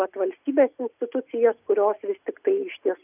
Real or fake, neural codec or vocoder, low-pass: real; none; 3.6 kHz